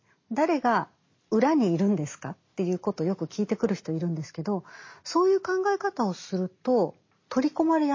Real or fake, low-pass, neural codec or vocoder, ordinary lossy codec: real; 7.2 kHz; none; MP3, 32 kbps